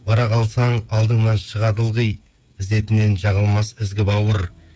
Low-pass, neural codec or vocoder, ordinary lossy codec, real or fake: none; codec, 16 kHz, 8 kbps, FreqCodec, smaller model; none; fake